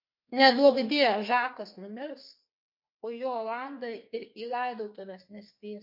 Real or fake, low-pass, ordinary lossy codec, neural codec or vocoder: fake; 5.4 kHz; MP3, 32 kbps; codec, 32 kHz, 1.9 kbps, SNAC